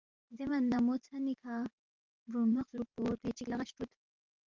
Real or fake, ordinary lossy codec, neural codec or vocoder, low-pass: fake; Opus, 24 kbps; vocoder, 44.1 kHz, 128 mel bands every 512 samples, BigVGAN v2; 7.2 kHz